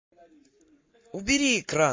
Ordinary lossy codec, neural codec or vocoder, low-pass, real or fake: MP3, 32 kbps; codec, 44.1 kHz, 7.8 kbps, Pupu-Codec; 7.2 kHz; fake